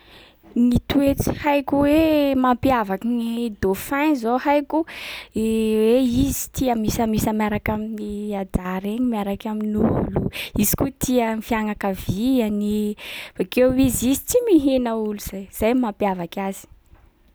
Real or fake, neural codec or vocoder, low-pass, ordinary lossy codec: real; none; none; none